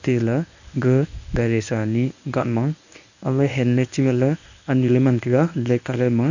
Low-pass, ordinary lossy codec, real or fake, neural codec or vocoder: 7.2 kHz; none; fake; codec, 24 kHz, 0.9 kbps, WavTokenizer, medium speech release version 1